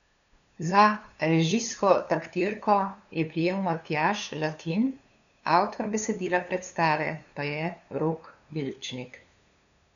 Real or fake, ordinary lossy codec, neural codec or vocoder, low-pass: fake; none; codec, 16 kHz, 2 kbps, FunCodec, trained on LibriTTS, 25 frames a second; 7.2 kHz